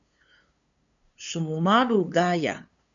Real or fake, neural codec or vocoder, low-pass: fake; codec, 16 kHz, 2 kbps, FunCodec, trained on LibriTTS, 25 frames a second; 7.2 kHz